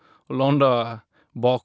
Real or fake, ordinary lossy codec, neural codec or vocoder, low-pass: real; none; none; none